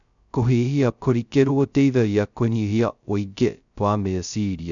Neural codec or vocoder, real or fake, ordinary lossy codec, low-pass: codec, 16 kHz, 0.2 kbps, FocalCodec; fake; none; 7.2 kHz